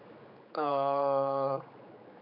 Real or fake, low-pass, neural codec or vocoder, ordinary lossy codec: fake; 5.4 kHz; codec, 16 kHz, 4 kbps, X-Codec, HuBERT features, trained on general audio; AAC, 48 kbps